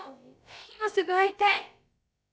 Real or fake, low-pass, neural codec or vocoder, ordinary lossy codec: fake; none; codec, 16 kHz, about 1 kbps, DyCAST, with the encoder's durations; none